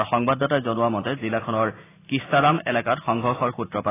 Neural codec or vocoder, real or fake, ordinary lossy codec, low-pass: none; real; AAC, 16 kbps; 3.6 kHz